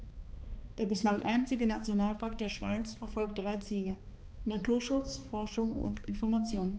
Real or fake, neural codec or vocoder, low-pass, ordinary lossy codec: fake; codec, 16 kHz, 2 kbps, X-Codec, HuBERT features, trained on balanced general audio; none; none